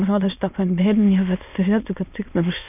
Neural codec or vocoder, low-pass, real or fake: autoencoder, 22.05 kHz, a latent of 192 numbers a frame, VITS, trained on many speakers; 3.6 kHz; fake